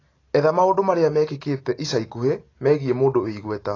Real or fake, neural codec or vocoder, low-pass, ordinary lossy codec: real; none; 7.2 kHz; AAC, 32 kbps